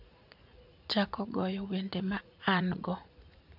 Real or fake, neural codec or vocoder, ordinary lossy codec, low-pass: fake; vocoder, 44.1 kHz, 80 mel bands, Vocos; none; 5.4 kHz